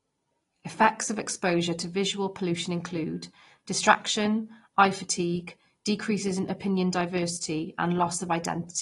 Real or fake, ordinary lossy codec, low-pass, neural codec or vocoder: real; AAC, 32 kbps; 10.8 kHz; none